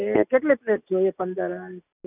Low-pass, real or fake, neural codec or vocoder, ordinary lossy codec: 3.6 kHz; real; none; none